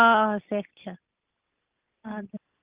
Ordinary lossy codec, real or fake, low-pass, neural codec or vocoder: Opus, 24 kbps; real; 3.6 kHz; none